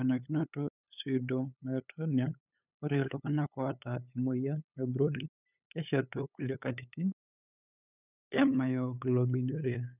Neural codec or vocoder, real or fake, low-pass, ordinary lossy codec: codec, 16 kHz, 8 kbps, FunCodec, trained on LibriTTS, 25 frames a second; fake; 3.6 kHz; none